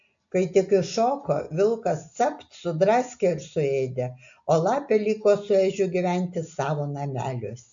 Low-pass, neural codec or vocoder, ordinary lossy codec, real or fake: 7.2 kHz; none; AAC, 64 kbps; real